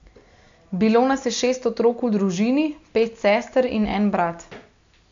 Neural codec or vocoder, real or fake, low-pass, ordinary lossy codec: none; real; 7.2 kHz; MP3, 64 kbps